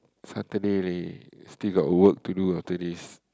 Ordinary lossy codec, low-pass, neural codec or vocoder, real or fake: none; none; none; real